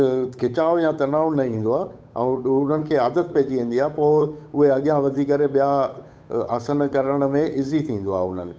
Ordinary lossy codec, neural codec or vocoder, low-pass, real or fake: none; codec, 16 kHz, 8 kbps, FunCodec, trained on Chinese and English, 25 frames a second; none; fake